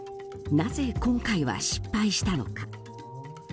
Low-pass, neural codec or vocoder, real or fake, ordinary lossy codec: none; none; real; none